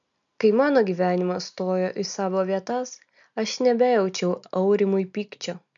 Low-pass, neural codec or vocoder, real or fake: 7.2 kHz; none; real